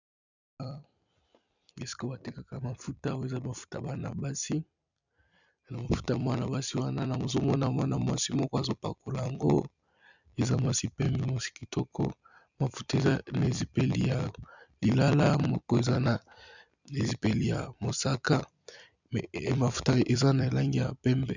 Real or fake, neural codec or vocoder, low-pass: fake; vocoder, 44.1 kHz, 128 mel bands every 256 samples, BigVGAN v2; 7.2 kHz